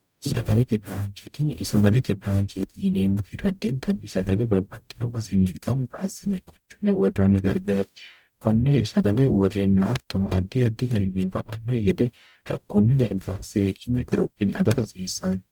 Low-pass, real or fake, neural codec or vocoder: 19.8 kHz; fake; codec, 44.1 kHz, 0.9 kbps, DAC